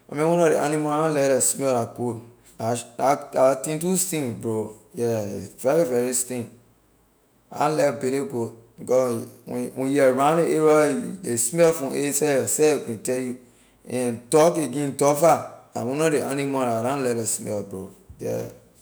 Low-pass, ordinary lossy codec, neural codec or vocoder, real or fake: none; none; autoencoder, 48 kHz, 128 numbers a frame, DAC-VAE, trained on Japanese speech; fake